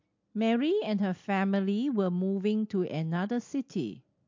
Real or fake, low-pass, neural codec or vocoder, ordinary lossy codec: real; 7.2 kHz; none; MP3, 48 kbps